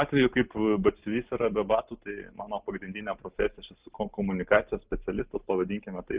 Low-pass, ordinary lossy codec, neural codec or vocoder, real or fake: 3.6 kHz; Opus, 24 kbps; none; real